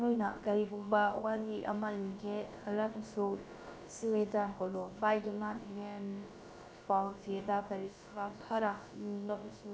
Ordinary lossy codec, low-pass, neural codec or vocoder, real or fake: none; none; codec, 16 kHz, about 1 kbps, DyCAST, with the encoder's durations; fake